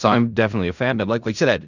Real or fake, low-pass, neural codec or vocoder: fake; 7.2 kHz; codec, 16 kHz in and 24 kHz out, 0.4 kbps, LongCat-Audio-Codec, fine tuned four codebook decoder